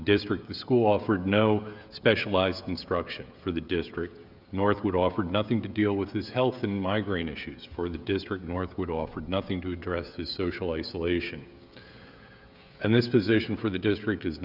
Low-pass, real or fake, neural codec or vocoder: 5.4 kHz; fake; codec, 16 kHz, 16 kbps, FreqCodec, smaller model